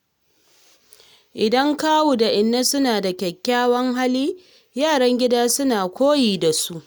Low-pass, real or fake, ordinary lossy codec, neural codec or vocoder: none; real; none; none